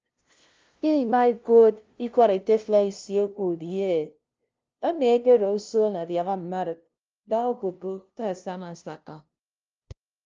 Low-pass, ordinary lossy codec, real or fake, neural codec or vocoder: 7.2 kHz; Opus, 24 kbps; fake; codec, 16 kHz, 0.5 kbps, FunCodec, trained on LibriTTS, 25 frames a second